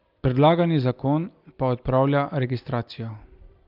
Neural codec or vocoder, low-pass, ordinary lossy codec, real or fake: none; 5.4 kHz; Opus, 24 kbps; real